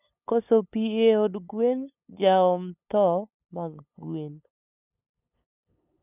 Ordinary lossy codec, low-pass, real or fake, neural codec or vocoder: none; 3.6 kHz; fake; codec, 16 kHz, 8 kbps, FunCodec, trained on LibriTTS, 25 frames a second